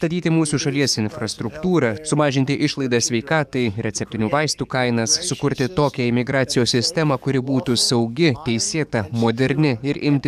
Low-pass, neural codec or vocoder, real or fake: 14.4 kHz; codec, 44.1 kHz, 7.8 kbps, DAC; fake